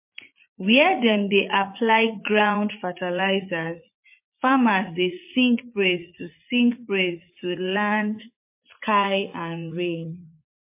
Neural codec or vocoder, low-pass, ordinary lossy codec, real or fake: vocoder, 44.1 kHz, 80 mel bands, Vocos; 3.6 kHz; MP3, 24 kbps; fake